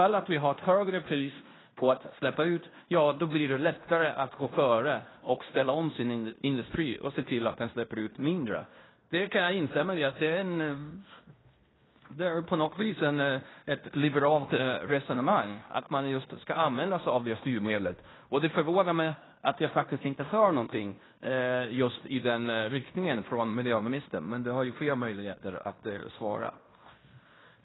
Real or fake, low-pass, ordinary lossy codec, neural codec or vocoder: fake; 7.2 kHz; AAC, 16 kbps; codec, 16 kHz in and 24 kHz out, 0.9 kbps, LongCat-Audio-Codec, fine tuned four codebook decoder